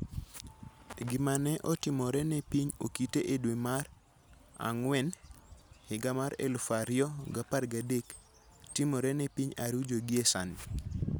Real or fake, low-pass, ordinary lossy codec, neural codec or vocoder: real; none; none; none